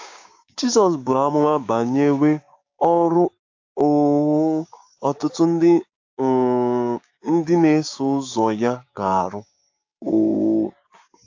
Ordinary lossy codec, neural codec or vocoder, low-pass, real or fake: AAC, 48 kbps; codec, 16 kHz, 6 kbps, DAC; 7.2 kHz; fake